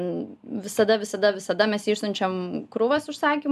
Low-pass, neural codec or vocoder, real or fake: 14.4 kHz; none; real